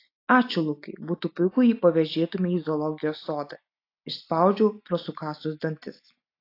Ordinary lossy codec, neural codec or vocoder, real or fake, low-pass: AAC, 32 kbps; none; real; 5.4 kHz